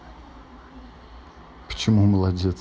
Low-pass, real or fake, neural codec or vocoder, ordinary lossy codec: none; real; none; none